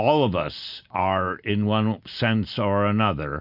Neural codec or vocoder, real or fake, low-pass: none; real; 5.4 kHz